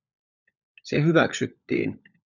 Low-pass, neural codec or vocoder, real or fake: 7.2 kHz; codec, 16 kHz, 16 kbps, FunCodec, trained on LibriTTS, 50 frames a second; fake